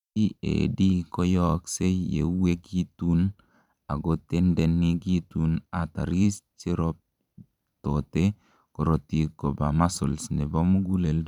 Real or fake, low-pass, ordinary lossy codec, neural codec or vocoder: fake; 19.8 kHz; none; vocoder, 44.1 kHz, 128 mel bands every 512 samples, BigVGAN v2